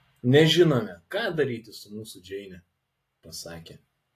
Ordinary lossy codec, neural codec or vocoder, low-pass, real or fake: AAC, 48 kbps; none; 14.4 kHz; real